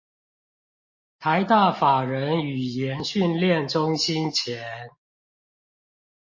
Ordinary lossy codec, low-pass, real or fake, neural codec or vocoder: MP3, 32 kbps; 7.2 kHz; real; none